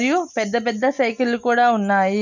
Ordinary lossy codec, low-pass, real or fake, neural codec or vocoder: none; 7.2 kHz; real; none